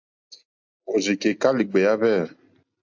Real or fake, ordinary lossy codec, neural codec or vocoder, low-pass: real; AAC, 48 kbps; none; 7.2 kHz